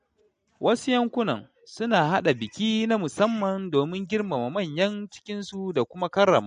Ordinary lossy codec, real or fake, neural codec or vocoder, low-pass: MP3, 48 kbps; real; none; 9.9 kHz